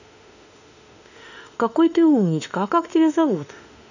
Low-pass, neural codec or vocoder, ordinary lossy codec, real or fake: 7.2 kHz; autoencoder, 48 kHz, 32 numbers a frame, DAC-VAE, trained on Japanese speech; none; fake